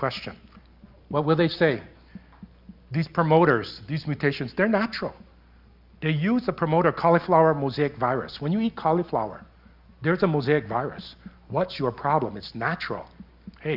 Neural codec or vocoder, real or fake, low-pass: none; real; 5.4 kHz